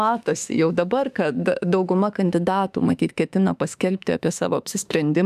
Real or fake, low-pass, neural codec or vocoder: fake; 14.4 kHz; autoencoder, 48 kHz, 32 numbers a frame, DAC-VAE, trained on Japanese speech